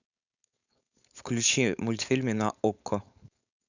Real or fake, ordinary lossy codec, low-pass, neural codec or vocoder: fake; none; 7.2 kHz; codec, 16 kHz, 4.8 kbps, FACodec